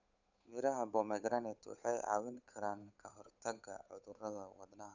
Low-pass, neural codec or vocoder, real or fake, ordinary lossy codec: 7.2 kHz; codec, 16 kHz, 8 kbps, FunCodec, trained on Chinese and English, 25 frames a second; fake; none